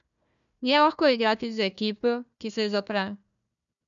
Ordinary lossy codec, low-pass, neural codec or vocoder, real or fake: none; 7.2 kHz; codec, 16 kHz, 1 kbps, FunCodec, trained on Chinese and English, 50 frames a second; fake